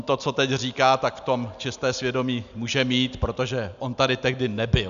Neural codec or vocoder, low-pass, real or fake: none; 7.2 kHz; real